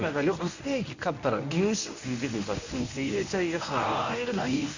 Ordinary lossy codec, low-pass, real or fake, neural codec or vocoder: none; 7.2 kHz; fake; codec, 24 kHz, 0.9 kbps, WavTokenizer, medium speech release version 1